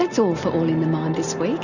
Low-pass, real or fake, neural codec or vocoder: 7.2 kHz; real; none